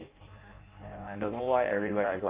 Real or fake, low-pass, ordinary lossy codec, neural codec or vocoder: fake; 3.6 kHz; Opus, 24 kbps; codec, 16 kHz in and 24 kHz out, 0.6 kbps, FireRedTTS-2 codec